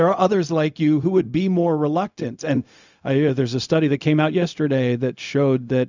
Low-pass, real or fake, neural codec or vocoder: 7.2 kHz; fake; codec, 16 kHz, 0.4 kbps, LongCat-Audio-Codec